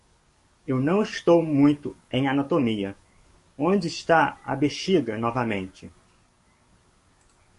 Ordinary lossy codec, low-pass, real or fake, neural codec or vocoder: MP3, 48 kbps; 14.4 kHz; fake; codec, 44.1 kHz, 7.8 kbps, DAC